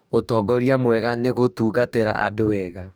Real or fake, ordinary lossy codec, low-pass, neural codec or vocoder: fake; none; none; codec, 44.1 kHz, 2.6 kbps, DAC